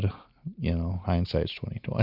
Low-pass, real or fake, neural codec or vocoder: 5.4 kHz; real; none